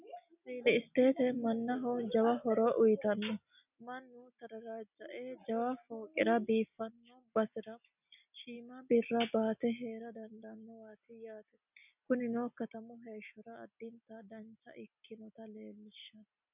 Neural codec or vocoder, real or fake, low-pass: none; real; 3.6 kHz